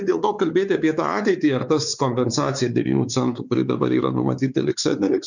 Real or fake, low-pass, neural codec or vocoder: fake; 7.2 kHz; codec, 16 kHz, 4 kbps, X-Codec, WavLM features, trained on Multilingual LibriSpeech